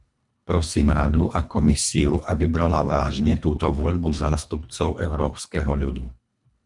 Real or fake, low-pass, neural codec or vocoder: fake; 10.8 kHz; codec, 24 kHz, 1.5 kbps, HILCodec